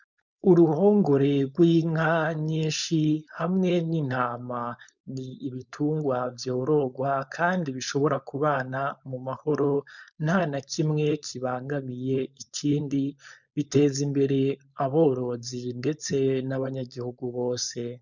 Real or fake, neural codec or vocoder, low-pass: fake; codec, 16 kHz, 4.8 kbps, FACodec; 7.2 kHz